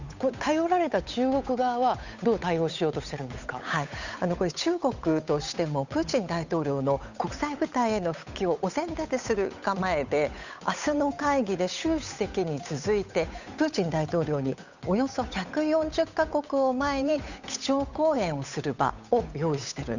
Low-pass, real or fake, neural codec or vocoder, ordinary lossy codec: 7.2 kHz; fake; codec, 16 kHz, 8 kbps, FunCodec, trained on Chinese and English, 25 frames a second; none